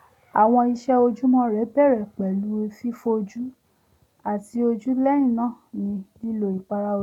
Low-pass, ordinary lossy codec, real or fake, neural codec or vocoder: 19.8 kHz; none; real; none